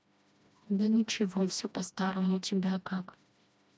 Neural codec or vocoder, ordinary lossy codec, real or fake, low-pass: codec, 16 kHz, 1 kbps, FreqCodec, smaller model; none; fake; none